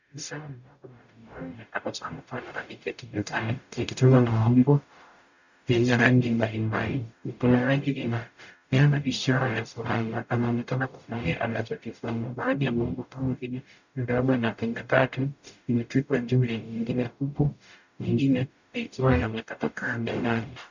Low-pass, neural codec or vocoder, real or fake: 7.2 kHz; codec, 44.1 kHz, 0.9 kbps, DAC; fake